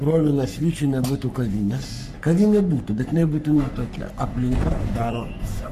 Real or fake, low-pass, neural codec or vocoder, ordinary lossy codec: fake; 14.4 kHz; codec, 44.1 kHz, 3.4 kbps, Pupu-Codec; MP3, 96 kbps